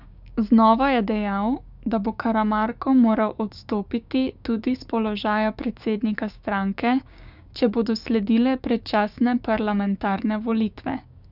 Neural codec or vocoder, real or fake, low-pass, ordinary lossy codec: codec, 16 kHz, 6 kbps, DAC; fake; 5.4 kHz; none